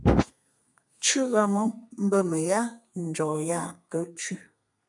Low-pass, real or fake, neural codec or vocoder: 10.8 kHz; fake; codec, 32 kHz, 1.9 kbps, SNAC